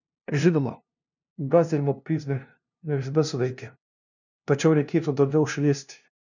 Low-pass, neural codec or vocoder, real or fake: 7.2 kHz; codec, 16 kHz, 0.5 kbps, FunCodec, trained on LibriTTS, 25 frames a second; fake